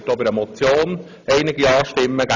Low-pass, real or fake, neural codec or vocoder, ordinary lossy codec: 7.2 kHz; real; none; none